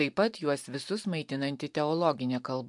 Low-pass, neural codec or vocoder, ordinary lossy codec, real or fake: 10.8 kHz; vocoder, 44.1 kHz, 128 mel bands every 512 samples, BigVGAN v2; MP3, 64 kbps; fake